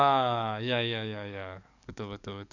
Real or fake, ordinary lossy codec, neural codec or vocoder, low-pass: fake; none; codec, 44.1 kHz, 7.8 kbps, DAC; 7.2 kHz